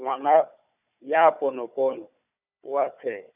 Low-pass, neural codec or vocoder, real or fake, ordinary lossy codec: 3.6 kHz; codec, 16 kHz, 4 kbps, FunCodec, trained on Chinese and English, 50 frames a second; fake; none